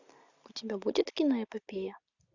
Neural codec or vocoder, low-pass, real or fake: none; 7.2 kHz; real